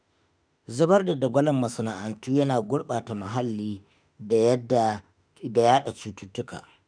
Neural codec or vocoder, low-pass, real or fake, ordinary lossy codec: autoencoder, 48 kHz, 32 numbers a frame, DAC-VAE, trained on Japanese speech; 9.9 kHz; fake; none